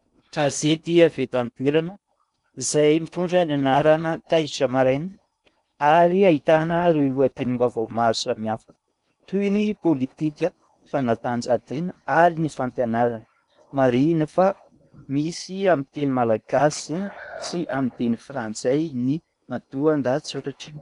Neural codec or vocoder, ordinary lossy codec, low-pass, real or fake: codec, 16 kHz in and 24 kHz out, 0.8 kbps, FocalCodec, streaming, 65536 codes; MP3, 96 kbps; 10.8 kHz; fake